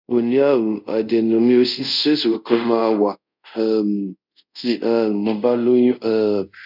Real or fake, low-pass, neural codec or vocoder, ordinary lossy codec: fake; 5.4 kHz; codec, 24 kHz, 0.5 kbps, DualCodec; none